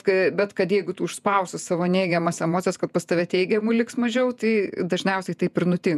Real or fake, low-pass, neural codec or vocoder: fake; 14.4 kHz; vocoder, 48 kHz, 128 mel bands, Vocos